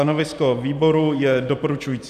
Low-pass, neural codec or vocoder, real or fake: 14.4 kHz; vocoder, 44.1 kHz, 128 mel bands every 512 samples, BigVGAN v2; fake